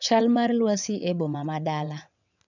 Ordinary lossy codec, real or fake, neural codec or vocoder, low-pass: none; fake; vocoder, 22.05 kHz, 80 mel bands, WaveNeXt; 7.2 kHz